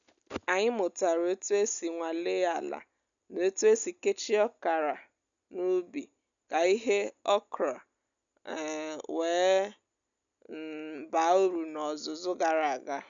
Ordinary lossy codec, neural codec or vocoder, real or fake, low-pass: none; none; real; 7.2 kHz